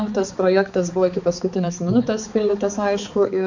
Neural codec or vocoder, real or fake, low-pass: codec, 16 kHz, 4 kbps, X-Codec, HuBERT features, trained on balanced general audio; fake; 7.2 kHz